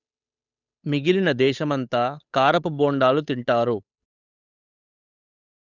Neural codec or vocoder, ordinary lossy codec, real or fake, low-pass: codec, 16 kHz, 8 kbps, FunCodec, trained on Chinese and English, 25 frames a second; none; fake; 7.2 kHz